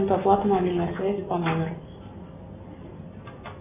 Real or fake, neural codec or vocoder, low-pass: real; none; 3.6 kHz